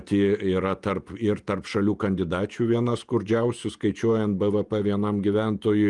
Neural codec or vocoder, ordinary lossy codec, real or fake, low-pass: vocoder, 48 kHz, 128 mel bands, Vocos; Opus, 32 kbps; fake; 10.8 kHz